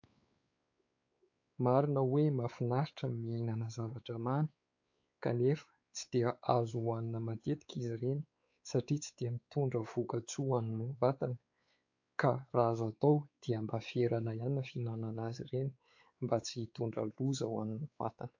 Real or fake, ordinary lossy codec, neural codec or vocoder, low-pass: fake; AAC, 48 kbps; codec, 16 kHz, 4 kbps, X-Codec, WavLM features, trained on Multilingual LibriSpeech; 7.2 kHz